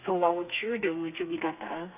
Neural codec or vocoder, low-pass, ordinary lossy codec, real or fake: codec, 32 kHz, 1.9 kbps, SNAC; 3.6 kHz; none; fake